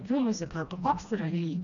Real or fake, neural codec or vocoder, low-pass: fake; codec, 16 kHz, 1 kbps, FreqCodec, smaller model; 7.2 kHz